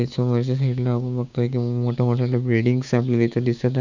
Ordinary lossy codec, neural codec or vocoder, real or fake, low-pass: none; codec, 16 kHz, 6 kbps, DAC; fake; 7.2 kHz